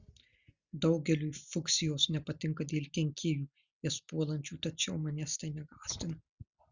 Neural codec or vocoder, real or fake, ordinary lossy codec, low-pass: none; real; Opus, 64 kbps; 7.2 kHz